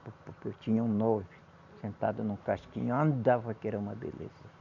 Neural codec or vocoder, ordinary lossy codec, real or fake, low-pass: none; none; real; 7.2 kHz